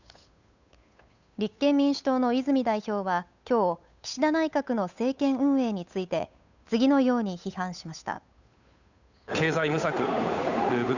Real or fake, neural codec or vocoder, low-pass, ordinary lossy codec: fake; codec, 16 kHz, 8 kbps, FunCodec, trained on Chinese and English, 25 frames a second; 7.2 kHz; none